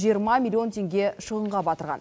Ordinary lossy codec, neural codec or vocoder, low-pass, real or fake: none; none; none; real